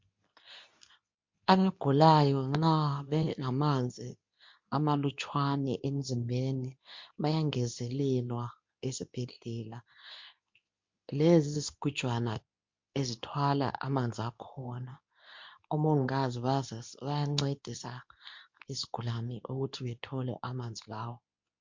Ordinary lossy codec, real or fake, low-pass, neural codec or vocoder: MP3, 48 kbps; fake; 7.2 kHz; codec, 24 kHz, 0.9 kbps, WavTokenizer, medium speech release version 2